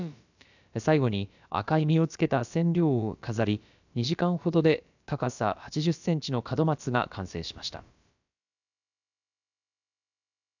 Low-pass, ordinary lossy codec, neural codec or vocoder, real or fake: 7.2 kHz; none; codec, 16 kHz, about 1 kbps, DyCAST, with the encoder's durations; fake